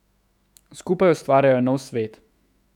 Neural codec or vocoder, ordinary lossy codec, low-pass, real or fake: autoencoder, 48 kHz, 128 numbers a frame, DAC-VAE, trained on Japanese speech; none; 19.8 kHz; fake